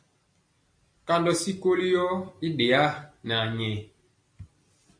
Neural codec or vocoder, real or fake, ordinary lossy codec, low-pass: none; real; AAC, 48 kbps; 9.9 kHz